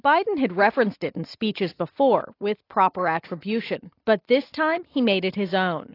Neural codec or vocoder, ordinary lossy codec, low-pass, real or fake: none; AAC, 32 kbps; 5.4 kHz; real